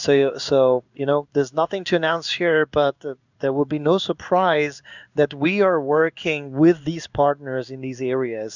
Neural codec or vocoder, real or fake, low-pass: codec, 16 kHz in and 24 kHz out, 1 kbps, XY-Tokenizer; fake; 7.2 kHz